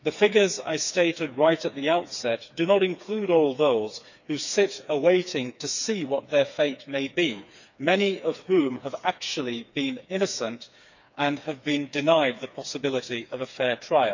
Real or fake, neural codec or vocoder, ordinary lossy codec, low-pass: fake; codec, 16 kHz, 4 kbps, FreqCodec, smaller model; none; 7.2 kHz